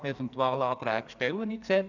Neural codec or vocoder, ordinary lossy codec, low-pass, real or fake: codec, 44.1 kHz, 2.6 kbps, SNAC; none; 7.2 kHz; fake